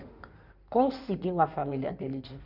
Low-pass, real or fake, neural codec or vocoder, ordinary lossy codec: 5.4 kHz; fake; codec, 16 kHz in and 24 kHz out, 2.2 kbps, FireRedTTS-2 codec; none